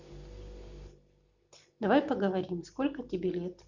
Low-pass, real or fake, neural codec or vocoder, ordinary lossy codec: 7.2 kHz; real; none; Opus, 64 kbps